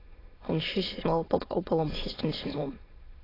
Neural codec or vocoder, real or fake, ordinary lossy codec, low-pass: autoencoder, 22.05 kHz, a latent of 192 numbers a frame, VITS, trained on many speakers; fake; AAC, 24 kbps; 5.4 kHz